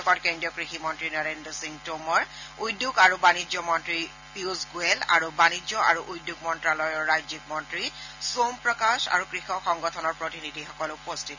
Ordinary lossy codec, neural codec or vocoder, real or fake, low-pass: none; none; real; 7.2 kHz